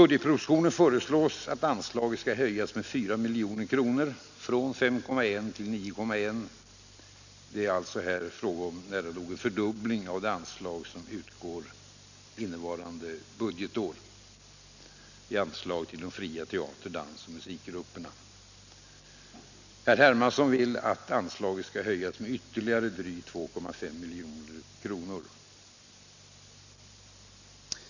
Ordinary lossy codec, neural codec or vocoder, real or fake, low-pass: none; none; real; 7.2 kHz